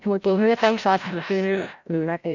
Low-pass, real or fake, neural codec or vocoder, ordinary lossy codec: 7.2 kHz; fake; codec, 16 kHz, 0.5 kbps, FreqCodec, larger model; none